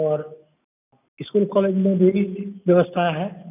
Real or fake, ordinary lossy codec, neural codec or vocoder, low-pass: real; none; none; 3.6 kHz